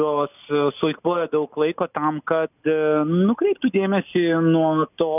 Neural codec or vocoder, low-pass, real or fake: none; 3.6 kHz; real